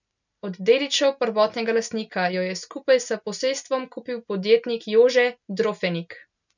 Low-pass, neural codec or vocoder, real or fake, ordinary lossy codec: 7.2 kHz; none; real; none